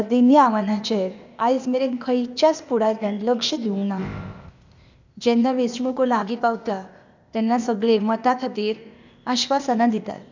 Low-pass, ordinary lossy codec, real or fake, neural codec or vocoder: 7.2 kHz; none; fake; codec, 16 kHz, 0.8 kbps, ZipCodec